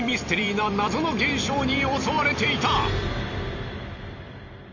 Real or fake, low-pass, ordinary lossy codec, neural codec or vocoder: fake; 7.2 kHz; none; vocoder, 44.1 kHz, 128 mel bands every 256 samples, BigVGAN v2